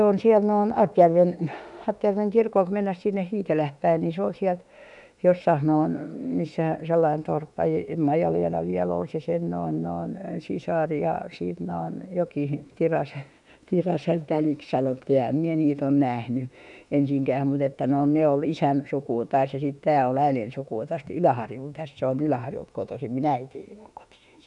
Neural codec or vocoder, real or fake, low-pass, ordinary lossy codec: autoencoder, 48 kHz, 32 numbers a frame, DAC-VAE, trained on Japanese speech; fake; 10.8 kHz; none